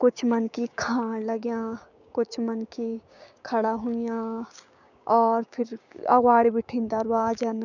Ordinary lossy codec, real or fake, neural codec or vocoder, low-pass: none; fake; codec, 16 kHz, 6 kbps, DAC; 7.2 kHz